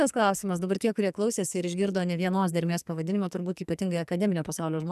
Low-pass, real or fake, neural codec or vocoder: 14.4 kHz; fake; codec, 44.1 kHz, 2.6 kbps, SNAC